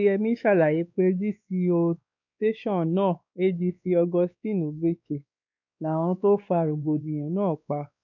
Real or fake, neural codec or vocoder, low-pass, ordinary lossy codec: fake; codec, 16 kHz, 2 kbps, X-Codec, WavLM features, trained on Multilingual LibriSpeech; 7.2 kHz; none